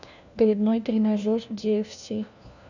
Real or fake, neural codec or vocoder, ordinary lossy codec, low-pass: fake; codec, 16 kHz, 1 kbps, FunCodec, trained on LibriTTS, 50 frames a second; none; 7.2 kHz